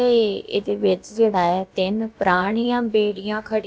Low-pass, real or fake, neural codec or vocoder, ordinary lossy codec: none; fake; codec, 16 kHz, about 1 kbps, DyCAST, with the encoder's durations; none